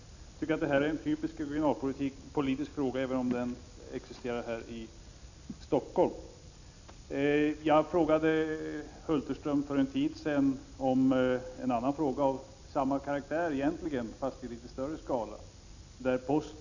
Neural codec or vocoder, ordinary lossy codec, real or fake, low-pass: none; none; real; 7.2 kHz